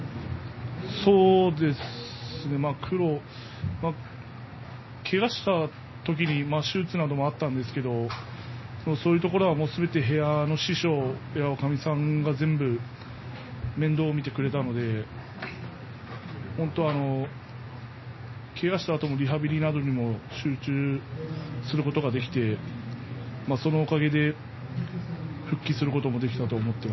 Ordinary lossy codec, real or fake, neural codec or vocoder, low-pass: MP3, 24 kbps; real; none; 7.2 kHz